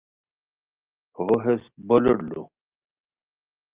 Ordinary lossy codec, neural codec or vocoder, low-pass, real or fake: Opus, 32 kbps; none; 3.6 kHz; real